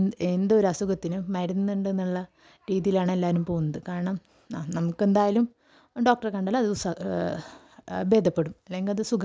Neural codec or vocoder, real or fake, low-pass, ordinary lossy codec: none; real; none; none